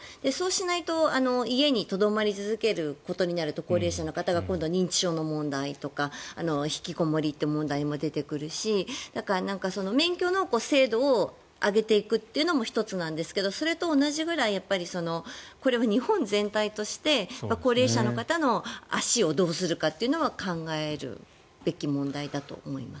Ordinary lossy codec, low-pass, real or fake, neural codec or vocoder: none; none; real; none